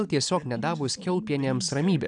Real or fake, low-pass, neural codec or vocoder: real; 9.9 kHz; none